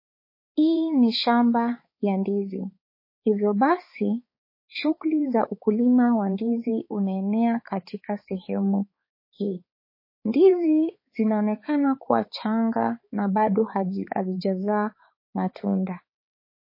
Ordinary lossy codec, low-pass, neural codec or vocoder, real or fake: MP3, 24 kbps; 5.4 kHz; codec, 16 kHz, 4 kbps, X-Codec, HuBERT features, trained on balanced general audio; fake